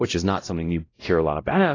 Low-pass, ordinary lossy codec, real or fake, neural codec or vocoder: 7.2 kHz; AAC, 32 kbps; fake; codec, 16 kHz, 0.5 kbps, X-Codec, WavLM features, trained on Multilingual LibriSpeech